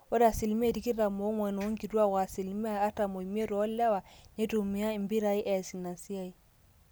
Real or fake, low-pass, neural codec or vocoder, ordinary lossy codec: real; none; none; none